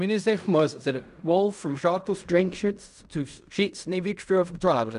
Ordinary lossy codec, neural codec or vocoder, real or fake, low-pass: none; codec, 16 kHz in and 24 kHz out, 0.4 kbps, LongCat-Audio-Codec, fine tuned four codebook decoder; fake; 10.8 kHz